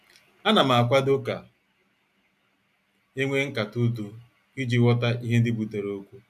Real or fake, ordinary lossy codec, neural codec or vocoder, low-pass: real; none; none; 14.4 kHz